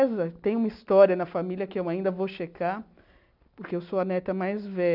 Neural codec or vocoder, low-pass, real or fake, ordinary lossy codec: none; 5.4 kHz; real; none